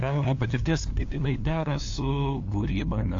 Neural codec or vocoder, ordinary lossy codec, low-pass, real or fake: codec, 16 kHz, 2 kbps, FunCodec, trained on LibriTTS, 25 frames a second; AAC, 48 kbps; 7.2 kHz; fake